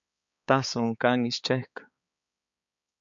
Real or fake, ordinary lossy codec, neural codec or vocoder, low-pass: fake; MP3, 48 kbps; codec, 16 kHz, 4 kbps, X-Codec, HuBERT features, trained on balanced general audio; 7.2 kHz